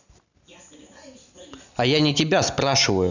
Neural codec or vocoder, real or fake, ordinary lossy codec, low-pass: none; real; none; 7.2 kHz